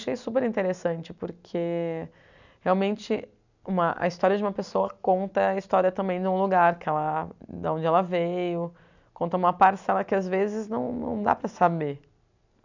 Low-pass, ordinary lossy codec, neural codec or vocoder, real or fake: 7.2 kHz; none; none; real